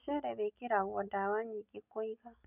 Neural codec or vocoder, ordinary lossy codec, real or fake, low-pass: none; none; real; 3.6 kHz